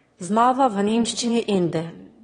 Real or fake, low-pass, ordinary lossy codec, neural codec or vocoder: fake; 9.9 kHz; AAC, 32 kbps; autoencoder, 22.05 kHz, a latent of 192 numbers a frame, VITS, trained on one speaker